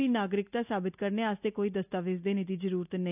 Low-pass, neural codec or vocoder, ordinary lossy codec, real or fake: 3.6 kHz; none; none; real